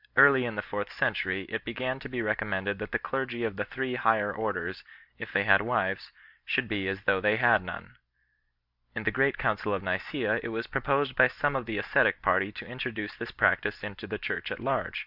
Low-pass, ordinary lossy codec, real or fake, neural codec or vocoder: 5.4 kHz; Opus, 64 kbps; real; none